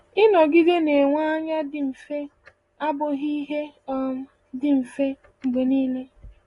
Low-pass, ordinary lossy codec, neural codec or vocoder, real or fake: 10.8 kHz; AAC, 32 kbps; none; real